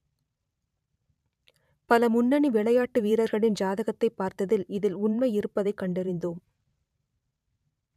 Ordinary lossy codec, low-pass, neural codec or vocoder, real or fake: none; 14.4 kHz; vocoder, 44.1 kHz, 128 mel bands every 512 samples, BigVGAN v2; fake